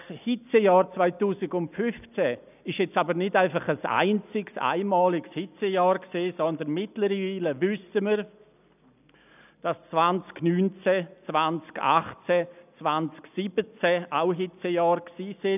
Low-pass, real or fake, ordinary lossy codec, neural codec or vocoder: 3.6 kHz; real; none; none